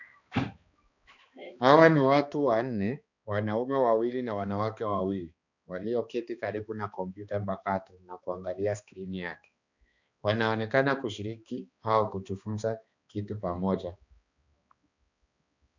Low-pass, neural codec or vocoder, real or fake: 7.2 kHz; codec, 16 kHz, 2 kbps, X-Codec, HuBERT features, trained on balanced general audio; fake